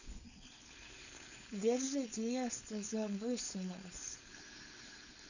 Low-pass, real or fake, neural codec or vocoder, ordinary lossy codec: 7.2 kHz; fake; codec, 16 kHz, 4.8 kbps, FACodec; none